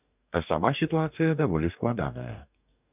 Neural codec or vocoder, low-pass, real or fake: codec, 44.1 kHz, 2.6 kbps, DAC; 3.6 kHz; fake